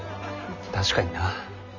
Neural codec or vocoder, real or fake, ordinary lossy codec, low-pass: none; real; none; 7.2 kHz